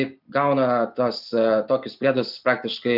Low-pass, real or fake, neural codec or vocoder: 5.4 kHz; real; none